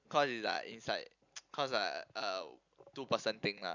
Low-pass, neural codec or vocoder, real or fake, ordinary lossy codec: 7.2 kHz; none; real; none